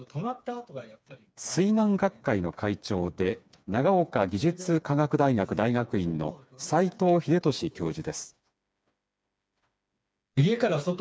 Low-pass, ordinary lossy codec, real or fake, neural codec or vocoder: none; none; fake; codec, 16 kHz, 4 kbps, FreqCodec, smaller model